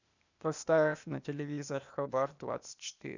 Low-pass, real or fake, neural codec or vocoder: 7.2 kHz; fake; codec, 16 kHz, 0.8 kbps, ZipCodec